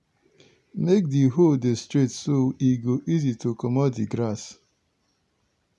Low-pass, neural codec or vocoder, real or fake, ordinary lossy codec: none; none; real; none